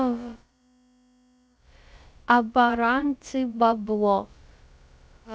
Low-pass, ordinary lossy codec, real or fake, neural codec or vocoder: none; none; fake; codec, 16 kHz, about 1 kbps, DyCAST, with the encoder's durations